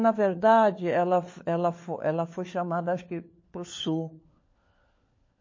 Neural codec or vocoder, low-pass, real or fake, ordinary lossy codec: codec, 16 kHz, 4 kbps, FunCodec, trained on LibriTTS, 50 frames a second; 7.2 kHz; fake; MP3, 32 kbps